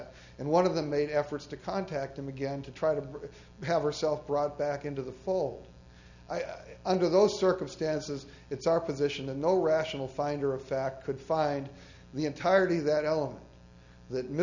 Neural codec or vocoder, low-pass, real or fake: none; 7.2 kHz; real